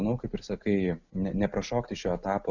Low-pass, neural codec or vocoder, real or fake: 7.2 kHz; none; real